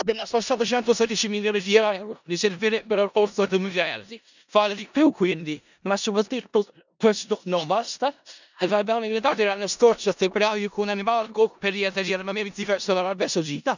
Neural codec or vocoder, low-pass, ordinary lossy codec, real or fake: codec, 16 kHz in and 24 kHz out, 0.4 kbps, LongCat-Audio-Codec, four codebook decoder; 7.2 kHz; none; fake